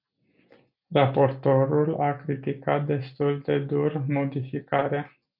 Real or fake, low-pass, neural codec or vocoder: real; 5.4 kHz; none